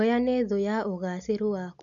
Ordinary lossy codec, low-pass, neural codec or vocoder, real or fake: none; 7.2 kHz; none; real